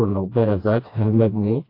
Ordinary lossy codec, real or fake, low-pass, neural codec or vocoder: AAC, 32 kbps; fake; 5.4 kHz; codec, 16 kHz, 1 kbps, FreqCodec, smaller model